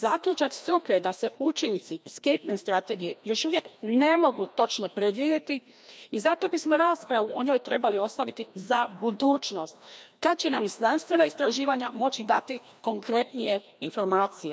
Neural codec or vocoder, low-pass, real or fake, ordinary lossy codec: codec, 16 kHz, 1 kbps, FreqCodec, larger model; none; fake; none